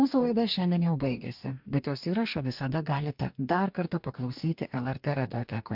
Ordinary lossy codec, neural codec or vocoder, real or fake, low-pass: MP3, 48 kbps; codec, 44.1 kHz, 2.6 kbps, DAC; fake; 5.4 kHz